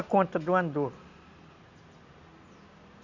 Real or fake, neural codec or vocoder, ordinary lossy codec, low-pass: real; none; none; 7.2 kHz